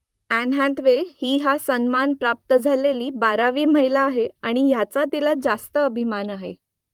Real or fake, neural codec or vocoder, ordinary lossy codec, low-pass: fake; vocoder, 44.1 kHz, 128 mel bands, Pupu-Vocoder; Opus, 32 kbps; 19.8 kHz